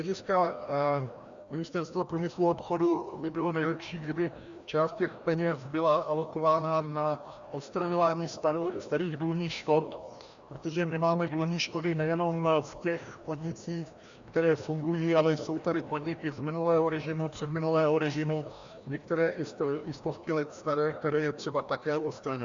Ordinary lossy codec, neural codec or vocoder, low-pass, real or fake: Opus, 64 kbps; codec, 16 kHz, 1 kbps, FreqCodec, larger model; 7.2 kHz; fake